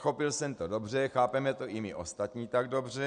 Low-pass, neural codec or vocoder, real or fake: 9.9 kHz; none; real